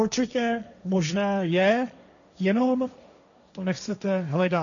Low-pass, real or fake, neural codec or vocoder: 7.2 kHz; fake; codec, 16 kHz, 1.1 kbps, Voila-Tokenizer